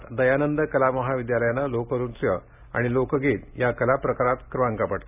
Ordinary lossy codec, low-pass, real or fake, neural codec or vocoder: none; 3.6 kHz; real; none